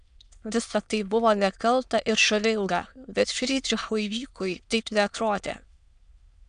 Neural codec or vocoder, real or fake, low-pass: autoencoder, 22.05 kHz, a latent of 192 numbers a frame, VITS, trained on many speakers; fake; 9.9 kHz